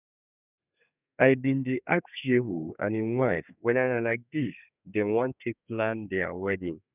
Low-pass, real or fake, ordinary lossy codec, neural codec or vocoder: 3.6 kHz; fake; none; codec, 32 kHz, 1.9 kbps, SNAC